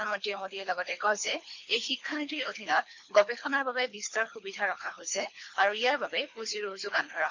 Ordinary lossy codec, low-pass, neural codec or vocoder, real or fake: MP3, 48 kbps; 7.2 kHz; codec, 24 kHz, 3 kbps, HILCodec; fake